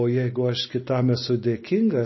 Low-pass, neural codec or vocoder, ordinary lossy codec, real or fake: 7.2 kHz; none; MP3, 24 kbps; real